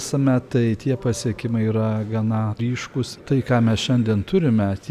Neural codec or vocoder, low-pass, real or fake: none; 14.4 kHz; real